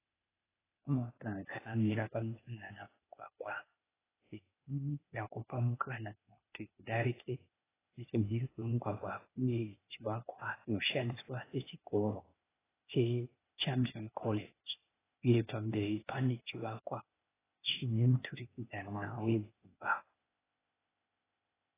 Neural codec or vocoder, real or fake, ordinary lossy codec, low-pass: codec, 16 kHz, 0.8 kbps, ZipCodec; fake; AAC, 16 kbps; 3.6 kHz